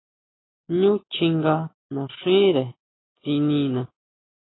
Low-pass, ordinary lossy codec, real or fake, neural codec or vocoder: 7.2 kHz; AAC, 16 kbps; real; none